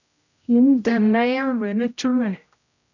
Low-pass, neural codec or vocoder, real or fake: 7.2 kHz; codec, 16 kHz, 0.5 kbps, X-Codec, HuBERT features, trained on general audio; fake